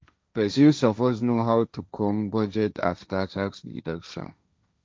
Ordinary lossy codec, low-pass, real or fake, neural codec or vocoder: none; none; fake; codec, 16 kHz, 1.1 kbps, Voila-Tokenizer